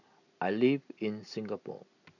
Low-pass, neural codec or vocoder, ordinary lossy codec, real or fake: 7.2 kHz; none; none; real